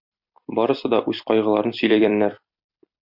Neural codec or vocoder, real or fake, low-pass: vocoder, 44.1 kHz, 128 mel bands every 256 samples, BigVGAN v2; fake; 5.4 kHz